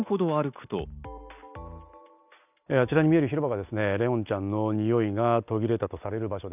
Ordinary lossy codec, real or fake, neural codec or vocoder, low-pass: none; real; none; 3.6 kHz